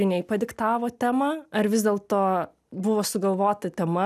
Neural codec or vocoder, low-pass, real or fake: none; 14.4 kHz; real